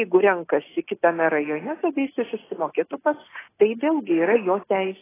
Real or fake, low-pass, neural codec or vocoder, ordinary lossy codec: real; 3.6 kHz; none; AAC, 16 kbps